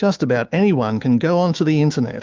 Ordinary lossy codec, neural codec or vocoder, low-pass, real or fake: Opus, 32 kbps; autoencoder, 48 kHz, 128 numbers a frame, DAC-VAE, trained on Japanese speech; 7.2 kHz; fake